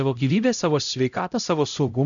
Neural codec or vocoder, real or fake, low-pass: codec, 16 kHz, 0.5 kbps, X-Codec, HuBERT features, trained on LibriSpeech; fake; 7.2 kHz